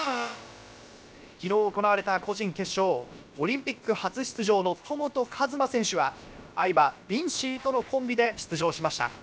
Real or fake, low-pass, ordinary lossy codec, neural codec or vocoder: fake; none; none; codec, 16 kHz, about 1 kbps, DyCAST, with the encoder's durations